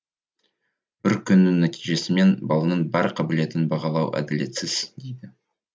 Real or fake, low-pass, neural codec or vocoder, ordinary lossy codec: real; none; none; none